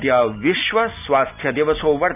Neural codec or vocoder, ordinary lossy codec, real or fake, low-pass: none; none; real; 3.6 kHz